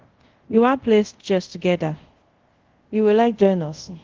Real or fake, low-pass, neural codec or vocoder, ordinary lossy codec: fake; 7.2 kHz; codec, 24 kHz, 0.5 kbps, DualCodec; Opus, 16 kbps